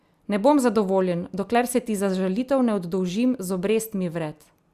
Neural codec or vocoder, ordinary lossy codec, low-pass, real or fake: none; Opus, 64 kbps; 14.4 kHz; real